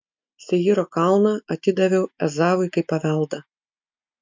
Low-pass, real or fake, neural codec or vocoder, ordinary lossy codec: 7.2 kHz; real; none; MP3, 48 kbps